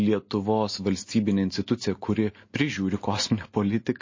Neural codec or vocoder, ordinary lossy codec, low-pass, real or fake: none; MP3, 32 kbps; 7.2 kHz; real